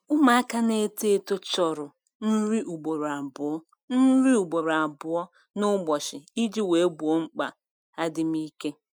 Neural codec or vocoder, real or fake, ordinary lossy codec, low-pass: none; real; none; 19.8 kHz